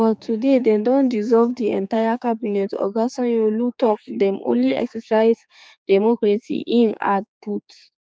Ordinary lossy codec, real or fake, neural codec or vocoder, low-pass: none; fake; codec, 16 kHz, 4 kbps, X-Codec, HuBERT features, trained on general audio; none